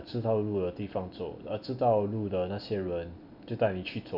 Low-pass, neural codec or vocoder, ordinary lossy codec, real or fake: 5.4 kHz; none; none; real